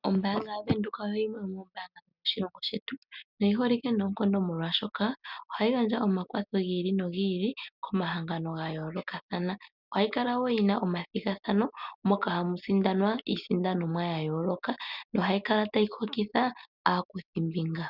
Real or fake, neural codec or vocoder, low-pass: real; none; 5.4 kHz